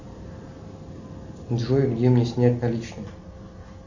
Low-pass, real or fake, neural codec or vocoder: 7.2 kHz; real; none